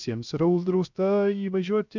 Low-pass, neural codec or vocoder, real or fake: 7.2 kHz; codec, 16 kHz, 0.3 kbps, FocalCodec; fake